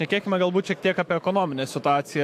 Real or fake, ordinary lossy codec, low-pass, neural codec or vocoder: fake; AAC, 64 kbps; 14.4 kHz; autoencoder, 48 kHz, 128 numbers a frame, DAC-VAE, trained on Japanese speech